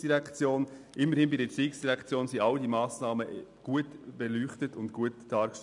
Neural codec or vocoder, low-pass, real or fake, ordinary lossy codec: none; 10.8 kHz; real; none